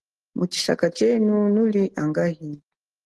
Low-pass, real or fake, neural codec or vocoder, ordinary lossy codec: 10.8 kHz; real; none; Opus, 16 kbps